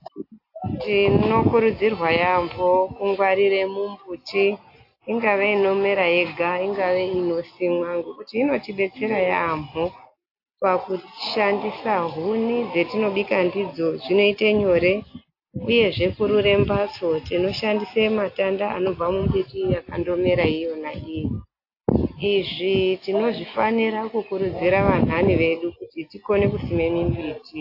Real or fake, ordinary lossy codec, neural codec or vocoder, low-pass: real; AAC, 32 kbps; none; 5.4 kHz